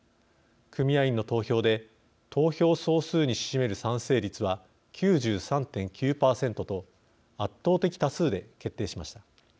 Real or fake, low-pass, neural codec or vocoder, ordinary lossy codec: real; none; none; none